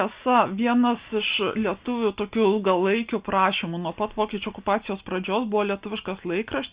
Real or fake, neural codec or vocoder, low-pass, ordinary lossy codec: real; none; 3.6 kHz; Opus, 64 kbps